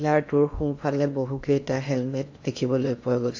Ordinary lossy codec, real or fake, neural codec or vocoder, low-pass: AAC, 48 kbps; fake; codec, 16 kHz in and 24 kHz out, 0.8 kbps, FocalCodec, streaming, 65536 codes; 7.2 kHz